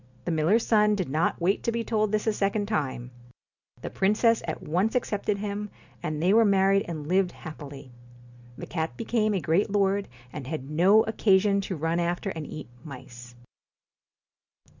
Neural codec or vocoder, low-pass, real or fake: none; 7.2 kHz; real